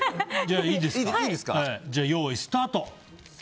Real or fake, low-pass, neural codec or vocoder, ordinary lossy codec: real; none; none; none